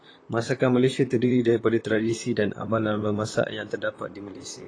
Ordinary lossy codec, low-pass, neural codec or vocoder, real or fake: AAC, 32 kbps; 9.9 kHz; vocoder, 44.1 kHz, 128 mel bands, Pupu-Vocoder; fake